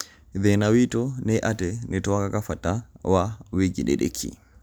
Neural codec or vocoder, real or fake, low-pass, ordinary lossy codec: none; real; none; none